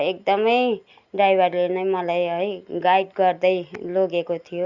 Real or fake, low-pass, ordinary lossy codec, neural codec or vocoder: real; 7.2 kHz; Opus, 64 kbps; none